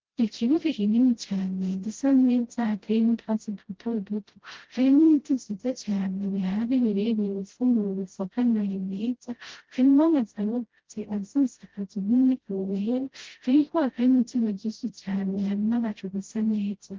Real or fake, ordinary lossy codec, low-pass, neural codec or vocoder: fake; Opus, 16 kbps; 7.2 kHz; codec, 16 kHz, 0.5 kbps, FreqCodec, smaller model